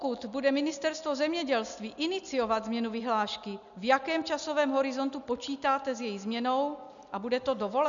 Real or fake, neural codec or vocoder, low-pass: real; none; 7.2 kHz